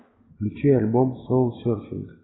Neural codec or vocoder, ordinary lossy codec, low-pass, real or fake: none; AAC, 16 kbps; 7.2 kHz; real